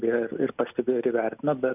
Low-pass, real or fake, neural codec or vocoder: 3.6 kHz; real; none